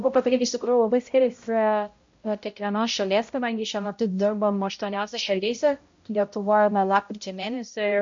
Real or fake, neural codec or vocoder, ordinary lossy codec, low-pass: fake; codec, 16 kHz, 0.5 kbps, X-Codec, HuBERT features, trained on balanced general audio; MP3, 48 kbps; 7.2 kHz